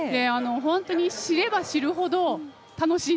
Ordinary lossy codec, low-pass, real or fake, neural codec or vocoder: none; none; real; none